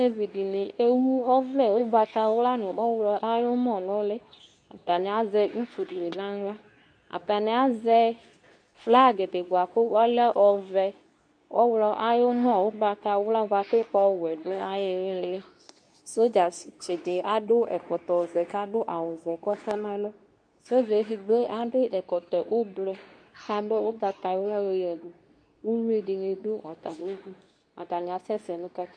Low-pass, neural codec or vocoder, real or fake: 9.9 kHz; codec, 24 kHz, 0.9 kbps, WavTokenizer, medium speech release version 2; fake